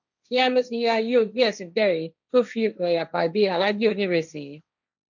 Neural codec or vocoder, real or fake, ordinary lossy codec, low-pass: codec, 16 kHz, 1.1 kbps, Voila-Tokenizer; fake; none; 7.2 kHz